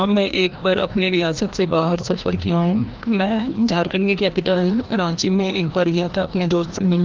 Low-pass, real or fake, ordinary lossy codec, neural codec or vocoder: 7.2 kHz; fake; Opus, 24 kbps; codec, 16 kHz, 1 kbps, FreqCodec, larger model